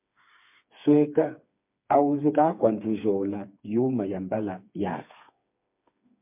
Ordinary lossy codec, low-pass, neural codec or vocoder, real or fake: MP3, 32 kbps; 3.6 kHz; codec, 16 kHz, 4 kbps, FreqCodec, smaller model; fake